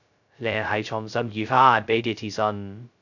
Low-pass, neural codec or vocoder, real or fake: 7.2 kHz; codec, 16 kHz, 0.2 kbps, FocalCodec; fake